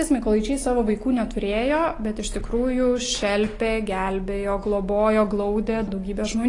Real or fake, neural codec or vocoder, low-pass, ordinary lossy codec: real; none; 10.8 kHz; AAC, 32 kbps